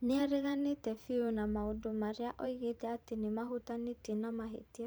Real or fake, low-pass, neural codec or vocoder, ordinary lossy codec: fake; none; vocoder, 44.1 kHz, 128 mel bands every 512 samples, BigVGAN v2; none